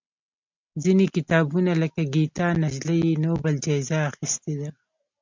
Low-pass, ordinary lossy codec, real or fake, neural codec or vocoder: 7.2 kHz; MP3, 64 kbps; real; none